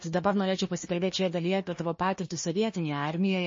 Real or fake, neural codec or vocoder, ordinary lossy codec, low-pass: fake; codec, 16 kHz, 1 kbps, FunCodec, trained on Chinese and English, 50 frames a second; MP3, 32 kbps; 7.2 kHz